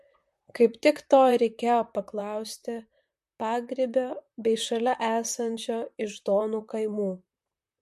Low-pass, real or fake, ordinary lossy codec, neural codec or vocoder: 14.4 kHz; fake; MP3, 64 kbps; vocoder, 44.1 kHz, 128 mel bands, Pupu-Vocoder